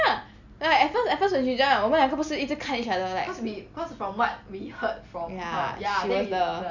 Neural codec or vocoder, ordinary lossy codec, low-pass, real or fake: none; none; 7.2 kHz; real